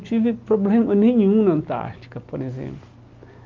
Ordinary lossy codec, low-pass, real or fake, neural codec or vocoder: Opus, 24 kbps; 7.2 kHz; real; none